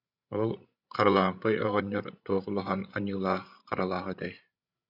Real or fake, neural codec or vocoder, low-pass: fake; codec, 16 kHz, 16 kbps, FreqCodec, larger model; 5.4 kHz